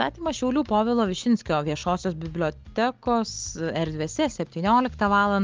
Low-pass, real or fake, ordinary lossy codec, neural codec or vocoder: 7.2 kHz; real; Opus, 24 kbps; none